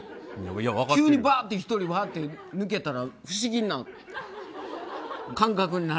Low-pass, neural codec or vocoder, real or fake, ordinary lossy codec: none; none; real; none